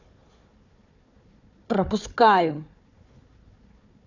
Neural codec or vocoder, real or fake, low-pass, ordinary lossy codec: codec, 16 kHz, 4 kbps, FunCodec, trained on Chinese and English, 50 frames a second; fake; 7.2 kHz; none